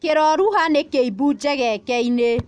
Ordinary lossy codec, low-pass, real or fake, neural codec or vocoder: none; 9.9 kHz; real; none